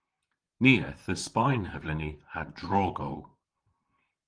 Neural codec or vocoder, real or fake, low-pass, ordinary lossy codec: vocoder, 44.1 kHz, 128 mel bands, Pupu-Vocoder; fake; 9.9 kHz; Opus, 24 kbps